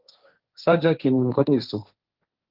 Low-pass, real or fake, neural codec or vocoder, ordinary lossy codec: 5.4 kHz; fake; codec, 16 kHz, 1.1 kbps, Voila-Tokenizer; Opus, 32 kbps